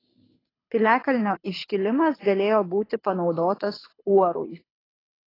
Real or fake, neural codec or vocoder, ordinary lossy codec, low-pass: fake; codec, 24 kHz, 6 kbps, HILCodec; AAC, 24 kbps; 5.4 kHz